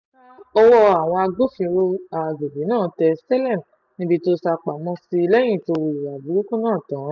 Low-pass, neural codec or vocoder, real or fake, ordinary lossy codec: 7.2 kHz; none; real; none